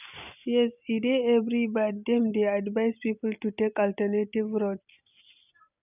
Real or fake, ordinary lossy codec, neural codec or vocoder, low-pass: real; none; none; 3.6 kHz